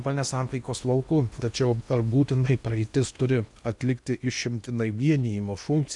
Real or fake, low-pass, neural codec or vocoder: fake; 10.8 kHz; codec, 16 kHz in and 24 kHz out, 0.8 kbps, FocalCodec, streaming, 65536 codes